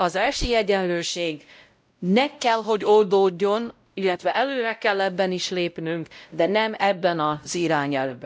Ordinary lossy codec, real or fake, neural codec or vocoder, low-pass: none; fake; codec, 16 kHz, 0.5 kbps, X-Codec, WavLM features, trained on Multilingual LibriSpeech; none